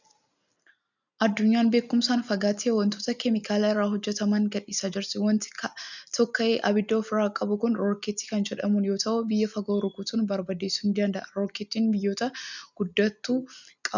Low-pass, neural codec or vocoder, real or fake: 7.2 kHz; none; real